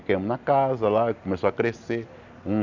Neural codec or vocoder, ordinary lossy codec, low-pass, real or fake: none; none; 7.2 kHz; real